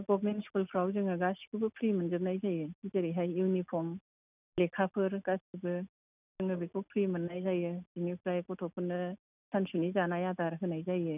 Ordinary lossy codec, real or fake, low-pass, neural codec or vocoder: none; real; 3.6 kHz; none